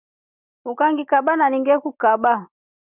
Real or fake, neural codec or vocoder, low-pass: real; none; 3.6 kHz